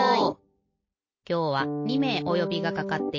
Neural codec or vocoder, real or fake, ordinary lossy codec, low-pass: none; real; MP3, 32 kbps; 7.2 kHz